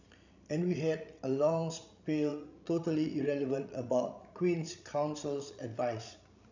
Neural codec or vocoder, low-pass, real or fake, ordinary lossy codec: codec, 16 kHz, 16 kbps, FreqCodec, larger model; 7.2 kHz; fake; none